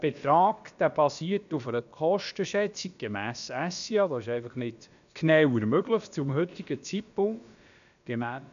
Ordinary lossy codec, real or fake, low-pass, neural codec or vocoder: none; fake; 7.2 kHz; codec, 16 kHz, about 1 kbps, DyCAST, with the encoder's durations